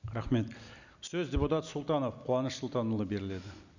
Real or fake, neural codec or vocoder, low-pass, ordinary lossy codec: real; none; 7.2 kHz; none